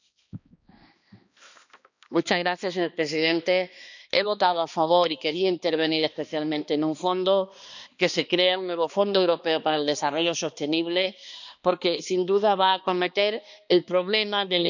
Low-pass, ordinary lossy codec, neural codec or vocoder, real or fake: 7.2 kHz; none; codec, 16 kHz, 2 kbps, X-Codec, HuBERT features, trained on balanced general audio; fake